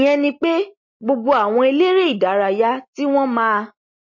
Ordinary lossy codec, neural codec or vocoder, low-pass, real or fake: MP3, 32 kbps; none; 7.2 kHz; real